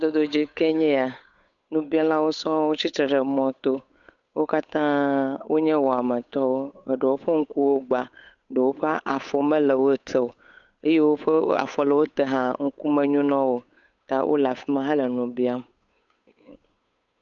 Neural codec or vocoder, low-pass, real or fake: codec, 16 kHz, 8 kbps, FunCodec, trained on Chinese and English, 25 frames a second; 7.2 kHz; fake